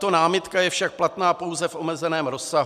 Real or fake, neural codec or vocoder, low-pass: real; none; 14.4 kHz